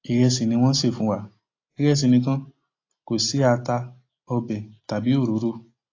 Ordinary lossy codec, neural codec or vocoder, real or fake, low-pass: AAC, 32 kbps; none; real; 7.2 kHz